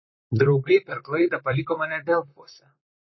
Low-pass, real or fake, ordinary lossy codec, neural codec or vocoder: 7.2 kHz; fake; MP3, 24 kbps; vocoder, 44.1 kHz, 128 mel bands, Pupu-Vocoder